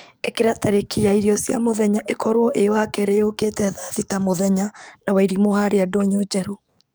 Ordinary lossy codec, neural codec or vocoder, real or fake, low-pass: none; codec, 44.1 kHz, 7.8 kbps, DAC; fake; none